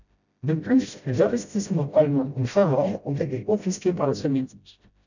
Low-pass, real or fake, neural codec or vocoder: 7.2 kHz; fake; codec, 16 kHz, 0.5 kbps, FreqCodec, smaller model